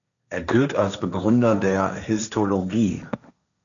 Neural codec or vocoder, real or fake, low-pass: codec, 16 kHz, 1.1 kbps, Voila-Tokenizer; fake; 7.2 kHz